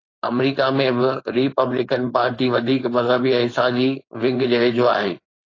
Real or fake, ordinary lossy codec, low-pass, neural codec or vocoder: fake; AAC, 32 kbps; 7.2 kHz; codec, 16 kHz, 4.8 kbps, FACodec